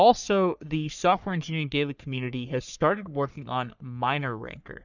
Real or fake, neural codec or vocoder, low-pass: fake; codec, 44.1 kHz, 3.4 kbps, Pupu-Codec; 7.2 kHz